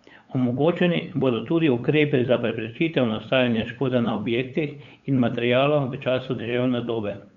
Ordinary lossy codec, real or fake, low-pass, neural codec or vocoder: none; fake; 7.2 kHz; codec, 16 kHz, 8 kbps, FunCodec, trained on LibriTTS, 25 frames a second